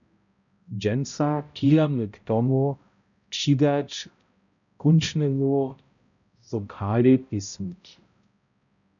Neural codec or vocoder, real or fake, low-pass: codec, 16 kHz, 0.5 kbps, X-Codec, HuBERT features, trained on balanced general audio; fake; 7.2 kHz